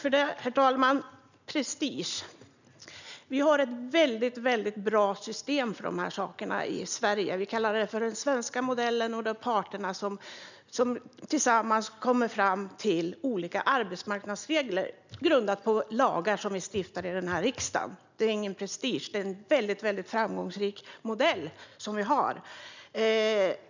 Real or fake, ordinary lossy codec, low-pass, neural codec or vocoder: real; none; 7.2 kHz; none